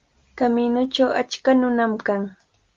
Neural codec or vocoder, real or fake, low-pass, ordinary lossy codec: none; real; 7.2 kHz; Opus, 32 kbps